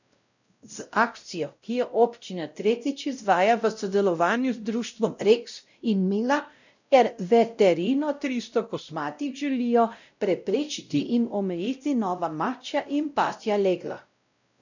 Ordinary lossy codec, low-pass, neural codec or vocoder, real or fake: none; 7.2 kHz; codec, 16 kHz, 0.5 kbps, X-Codec, WavLM features, trained on Multilingual LibriSpeech; fake